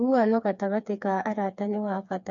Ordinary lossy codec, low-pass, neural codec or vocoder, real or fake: none; 7.2 kHz; codec, 16 kHz, 4 kbps, FreqCodec, smaller model; fake